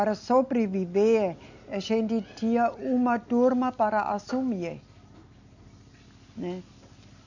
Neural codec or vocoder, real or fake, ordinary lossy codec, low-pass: none; real; none; 7.2 kHz